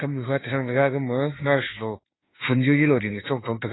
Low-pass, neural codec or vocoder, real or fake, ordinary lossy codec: 7.2 kHz; autoencoder, 48 kHz, 32 numbers a frame, DAC-VAE, trained on Japanese speech; fake; AAC, 16 kbps